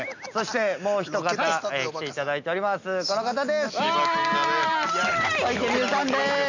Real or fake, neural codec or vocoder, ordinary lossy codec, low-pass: real; none; none; 7.2 kHz